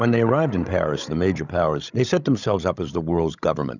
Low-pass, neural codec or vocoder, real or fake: 7.2 kHz; codec, 16 kHz, 16 kbps, FreqCodec, larger model; fake